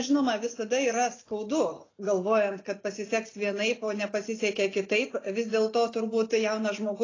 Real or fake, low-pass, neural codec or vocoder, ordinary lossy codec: real; 7.2 kHz; none; AAC, 32 kbps